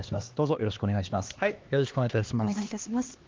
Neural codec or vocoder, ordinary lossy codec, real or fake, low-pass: codec, 16 kHz, 2 kbps, X-Codec, HuBERT features, trained on LibriSpeech; Opus, 32 kbps; fake; 7.2 kHz